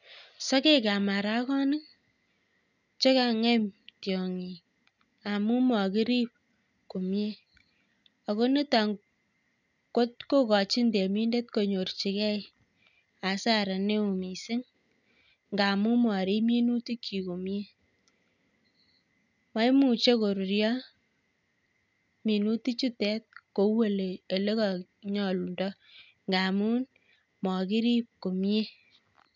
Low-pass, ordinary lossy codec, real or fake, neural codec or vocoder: 7.2 kHz; none; real; none